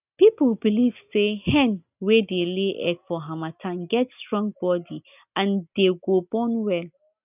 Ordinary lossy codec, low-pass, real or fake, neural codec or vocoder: none; 3.6 kHz; real; none